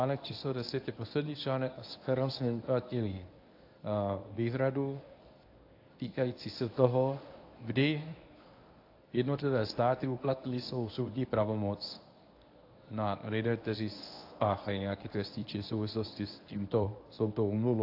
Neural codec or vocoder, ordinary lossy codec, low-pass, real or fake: codec, 24 kHz, 0.9 kbps, WavTokenizer, medium speech release version 1; AAC, 32 kbps; 5.4 kHz; fake